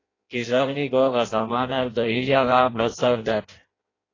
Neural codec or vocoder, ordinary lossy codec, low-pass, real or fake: codec, 16 kHz in and 24 kHz out, 0.6 kbps, FireRedTTS-2 codec; AAC, 32 kbps; 7.2 kHz; fake